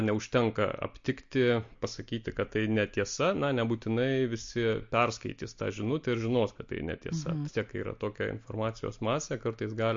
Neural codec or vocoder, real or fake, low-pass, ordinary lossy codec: none; real; 7.2 kHz; MP3, 48 kbps